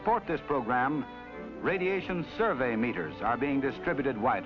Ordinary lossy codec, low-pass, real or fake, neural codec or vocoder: AAC, 48 kbps; 7.2 kHz; real; none